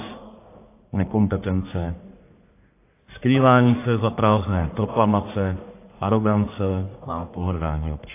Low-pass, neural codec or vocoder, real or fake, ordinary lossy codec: 3.6 kHz; codec, 44.1 kHz, 1.7 kbps, Pupu-Codec; fake; AAC, 24 kbps